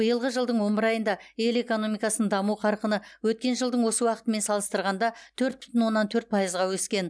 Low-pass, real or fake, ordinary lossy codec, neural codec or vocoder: none; real; none; none